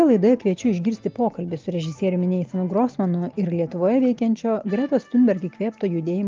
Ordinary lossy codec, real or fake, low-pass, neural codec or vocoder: Opus, 24 kbps; real; 7.2 kHz; none